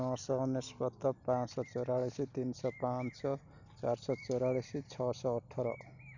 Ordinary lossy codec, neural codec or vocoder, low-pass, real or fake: none; none; 7.2 kHz; real